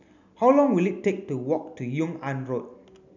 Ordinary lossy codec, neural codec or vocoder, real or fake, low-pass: none; none; real; 7.2 kHz